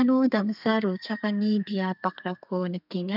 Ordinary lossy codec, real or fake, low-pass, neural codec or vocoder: none; fake; 5.4 kHz; codec, 32 kHz, 1.9 kbps, SNAC